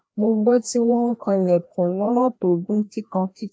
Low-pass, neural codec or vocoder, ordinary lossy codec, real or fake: none; codec, 16 kHz, 1 kbps, FreqCodec, larger model; none; fake